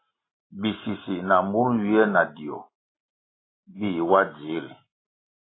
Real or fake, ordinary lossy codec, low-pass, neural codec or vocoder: real; AAC, 16 kbps; 7.2 kHz; none